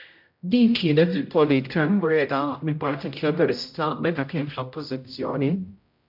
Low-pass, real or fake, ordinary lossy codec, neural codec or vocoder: 5.4 kHz; fake; MP3, 48 kbps; codec, 16 kHz, 0.5 kbps, X-Codec, HuBERT features, trained on general audio